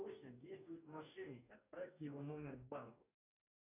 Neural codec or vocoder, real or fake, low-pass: codec, 44.1 kHz, 2.6 kbps, DAC; fake; 3.6 kHz